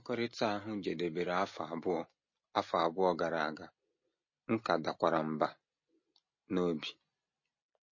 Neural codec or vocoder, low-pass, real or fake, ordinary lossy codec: none; 7.2 kHz; real; MP3, 32 kbps